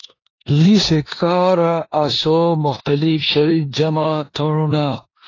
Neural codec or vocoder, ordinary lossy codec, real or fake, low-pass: codec, 16 kHz in and 24 kHz out, 0.9 kbps, LongCat-Audio-Codec, four codebook decoder; AAC, 32 kbps; fake; 7.2 kHz